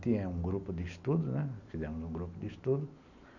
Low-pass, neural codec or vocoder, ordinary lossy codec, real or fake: 7.2 kHz; none; none; real